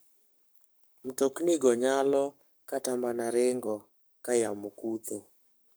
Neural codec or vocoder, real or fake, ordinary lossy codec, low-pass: codec, 44.1 kHz, 7.8 kbps, Pupu-Codec; fake; none; none